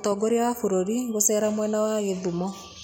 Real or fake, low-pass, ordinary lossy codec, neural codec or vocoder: real; none; none; none